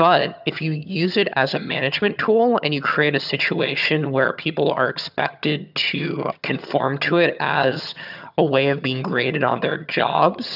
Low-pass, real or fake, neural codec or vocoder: 5.4 kHz; fake; vocoder, 22.05 kHz, 80 mel bands, HiFi-GAN